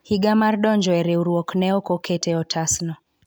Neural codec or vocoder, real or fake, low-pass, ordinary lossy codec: none; real; none; none